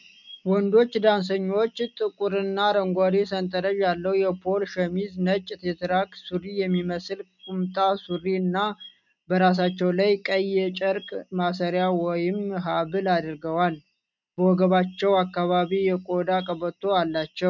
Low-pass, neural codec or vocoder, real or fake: 7.2 kHz; none; real